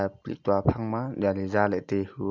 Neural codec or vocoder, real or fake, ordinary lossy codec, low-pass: none; real; MP3, 64 kbps; 7.2 kHz